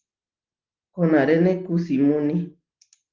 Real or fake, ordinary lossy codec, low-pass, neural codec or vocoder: real; Opus, 16 kbps; 7.2 kHz; none